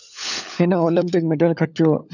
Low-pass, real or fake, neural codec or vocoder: 7.2 kHz; fake; codec, 16 kHz, 16 kbps, FunCodec, trained on LibriTTS, 50 frames a second